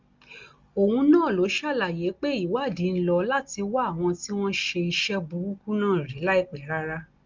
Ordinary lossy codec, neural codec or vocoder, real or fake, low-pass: Opus, 32 kbps; none; real; 7.2 kHz